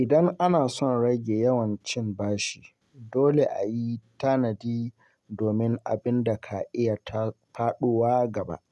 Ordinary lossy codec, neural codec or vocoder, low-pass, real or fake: none; none; none; real